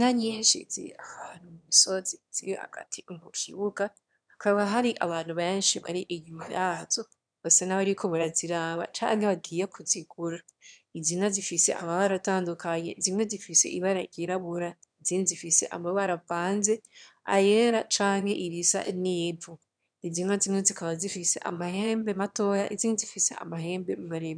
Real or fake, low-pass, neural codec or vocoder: fake; 9.9 kHz; autoencoder, 22.05 kHz, a latent of 192 numbers a frame, VITS, trained on one speaker